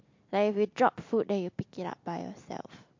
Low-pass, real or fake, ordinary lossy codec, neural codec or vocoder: 7.2 kHz; real; MP3, 48 kbps; none